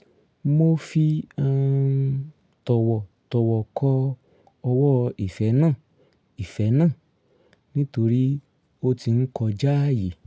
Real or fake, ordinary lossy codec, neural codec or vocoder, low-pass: real; none; none; none